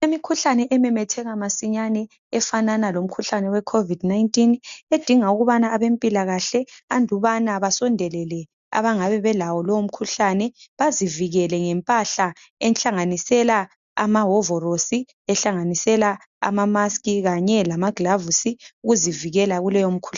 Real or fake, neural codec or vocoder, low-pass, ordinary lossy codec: real; none; 7.2 kHz; MP3, 64 kbps